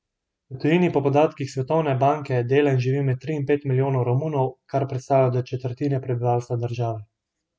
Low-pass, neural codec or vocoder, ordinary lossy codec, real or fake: none; none; none; real